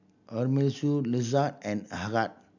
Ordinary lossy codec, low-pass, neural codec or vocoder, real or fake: none; 7.2 kHz; none; real